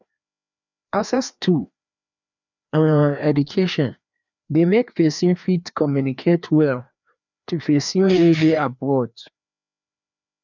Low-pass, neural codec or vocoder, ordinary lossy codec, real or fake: 7.2 kHz; codec, 16 kHz, 2 kbps, FreqCodec, larger model; none; fake